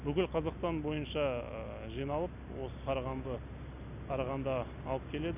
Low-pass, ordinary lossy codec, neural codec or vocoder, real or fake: 3.6 kHz; none; none; real